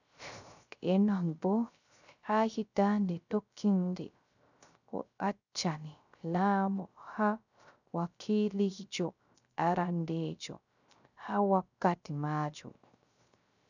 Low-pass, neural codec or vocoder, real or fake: 7.2 kHz; codec, 16 kHz, 0.3 kbps, FocalCodec; fake